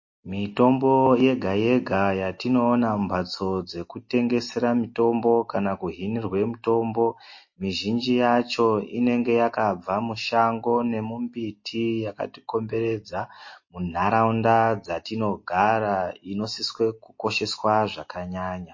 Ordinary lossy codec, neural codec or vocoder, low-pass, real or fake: MP3, 32 kbps; none; 7.2 kHz; real